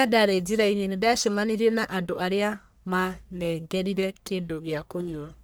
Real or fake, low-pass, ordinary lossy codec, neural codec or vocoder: fake; none; none; codec, 44.1 kHz, 1.7 kbps, Pupu-Codec